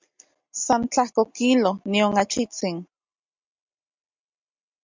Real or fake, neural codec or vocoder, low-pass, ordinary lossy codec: real; none; 7.2 kHz; MP3, 64 kbps